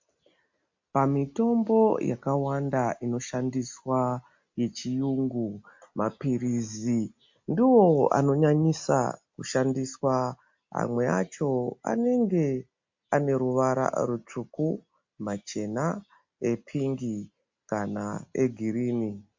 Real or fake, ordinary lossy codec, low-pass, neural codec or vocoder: real; MP3, 48 kbps; 7.2 kHz; none